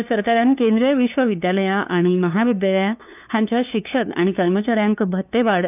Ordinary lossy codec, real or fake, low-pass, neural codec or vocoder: none; fake; 3.6 kHz; codec, 16 kHz, 2 kbps, FunCodec, trained on LibriTTS, 25 frames a second